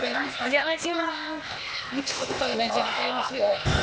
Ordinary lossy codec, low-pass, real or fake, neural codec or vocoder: none; none; fake; codec, 16 kHz, 0.8 kbps, ZipCodec